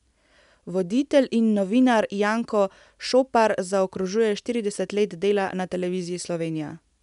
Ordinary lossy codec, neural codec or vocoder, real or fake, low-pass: none; none; real; 10.8 kHz